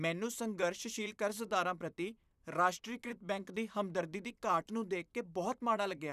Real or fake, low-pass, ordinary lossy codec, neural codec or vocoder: fake; 14.4 kHz; none; vocoder, 44.1 kHz, 128 mel bands, Pupu-Vocoder